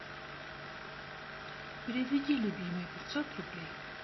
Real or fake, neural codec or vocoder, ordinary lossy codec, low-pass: real; none; MP3, 24 kbps; 7.2 kHz